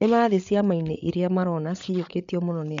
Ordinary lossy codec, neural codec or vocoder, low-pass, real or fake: MP3, 64 kbps; codec, 16 kHz, 8 kbps, FreqCodec, larger model; 7.2 kHz; fake